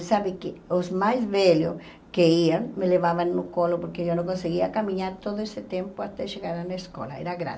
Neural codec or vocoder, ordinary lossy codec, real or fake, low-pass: none; none; real; none